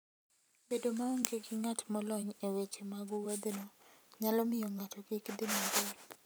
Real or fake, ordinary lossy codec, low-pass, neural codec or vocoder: fake; none; none; vocoder, 44.1 kHz, 128 mel bands every 512 samples, BigVGAN v2